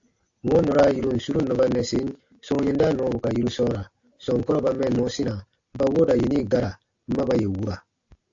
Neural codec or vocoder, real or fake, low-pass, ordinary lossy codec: none; real; 7.2 kHz; AAC, 48 kbps